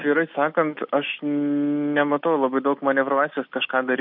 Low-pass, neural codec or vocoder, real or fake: 5.4 kHz; none; real